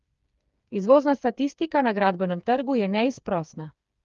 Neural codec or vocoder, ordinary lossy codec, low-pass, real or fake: codec, 16 kHz, 4 kbps, FreqCodec, smaller model; Opus, 32 kbps; 7.2 kHz; fake